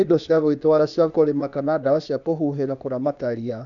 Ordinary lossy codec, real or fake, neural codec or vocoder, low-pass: none; fake; codec, 16 kHz, 0.8 kbps, ZipCodec; 7.2 kHz